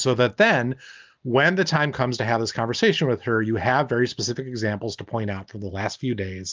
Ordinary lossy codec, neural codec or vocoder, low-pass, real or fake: Opus, 24 kbps; none; 7.2 kHz; real